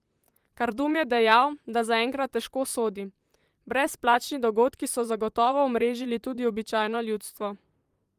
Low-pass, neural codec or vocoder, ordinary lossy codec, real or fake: 14.4 kHz; vocoder, 44.1 kHz, 128 mel bands, Pupu-Vocoder; Opus, 32 kbps; fake